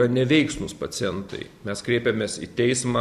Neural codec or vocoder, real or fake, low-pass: none; real; 14.4 kHz